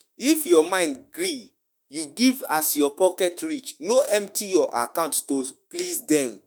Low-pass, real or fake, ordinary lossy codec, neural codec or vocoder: none; fake; none; autoencoder, 48 kHz, 32 numbers a frame, DAC-VAE, trained on Japanese speech